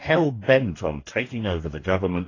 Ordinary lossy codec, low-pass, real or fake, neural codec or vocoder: AAC, 32 kbps; 7.2 kHz; fake; codec, 44.1 kHz, 2.6 kbps, DAC